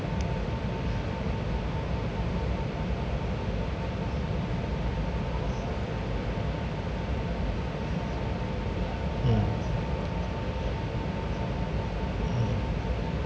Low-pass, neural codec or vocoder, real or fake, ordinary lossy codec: none; none; real; none